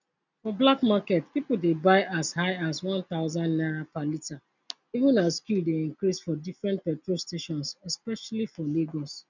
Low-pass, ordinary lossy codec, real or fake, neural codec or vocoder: 7.2 kHz; none; real; none